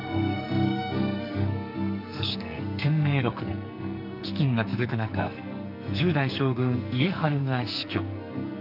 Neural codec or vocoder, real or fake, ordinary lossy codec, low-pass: codec, 44.1 kHz, 2.6 kbps, SNAC; fake; none; 5.4 kHz